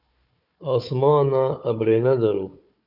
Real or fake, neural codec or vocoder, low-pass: fake; codec, 16 kHz, 4 kbps, FunCodec, trained on Chinese and English, 50 frames a second; 5.4 kHz